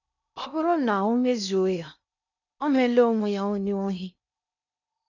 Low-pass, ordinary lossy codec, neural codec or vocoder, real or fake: 7.2 kHz; none; codec, 16 kHz in and 24 kHz out, 0.6 kbps, FocalCodec, streaming, 2048 codes; fake